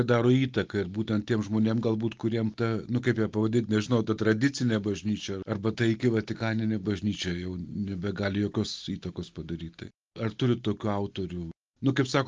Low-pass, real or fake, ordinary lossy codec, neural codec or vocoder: 7.2 kHz; real; Opus, 32 kbps; none